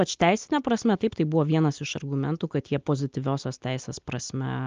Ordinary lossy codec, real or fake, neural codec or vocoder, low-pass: Opus, 24 kbps; real; none; 7.2 kHz